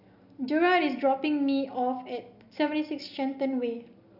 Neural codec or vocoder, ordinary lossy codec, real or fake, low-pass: none; none; real; 5.4 kHz